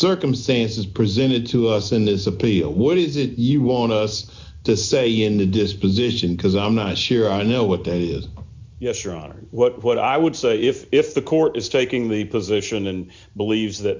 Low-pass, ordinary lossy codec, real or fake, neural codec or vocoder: 7.2 kHz; MP3, 64 kbps; real; none